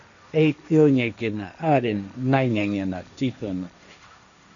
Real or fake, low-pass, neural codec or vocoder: fake; 7.2 kHz; codec, 16 kHz, 1.1 kbps, Voila-Tokenizer